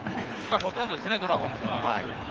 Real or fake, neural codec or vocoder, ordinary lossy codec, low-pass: fake; codec, 24 kHz, 3 kbps, HILCodec; Opus, 24 kbps; 7.2 kHz